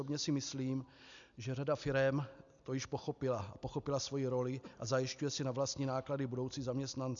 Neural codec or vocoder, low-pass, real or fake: none; 7.2 kHz; real